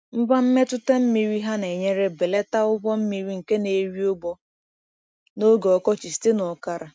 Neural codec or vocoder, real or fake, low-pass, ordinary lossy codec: none; real; none; none